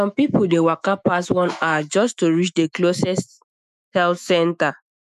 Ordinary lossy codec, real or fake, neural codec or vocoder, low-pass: none; fake; autoencoder, 48 kHz, 128 numbers a frame, DAC-VAE, trained on Japanese speech; 14.4 kHz